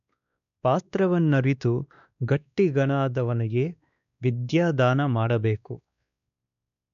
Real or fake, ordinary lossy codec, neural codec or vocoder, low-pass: fake; none; codec, 16 kHz, 2 kbps, X-Codec, WavLM features, trained on Multilingual LibriSpeech; 7.2 kHz